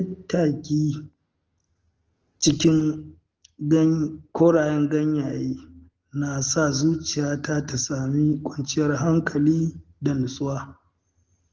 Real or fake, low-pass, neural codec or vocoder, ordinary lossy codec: real; 7.2 kHz; none; Opus, 16 kbps